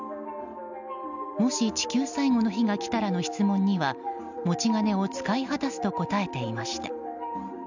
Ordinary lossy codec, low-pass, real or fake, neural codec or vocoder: none; 7.2 kHz; real; none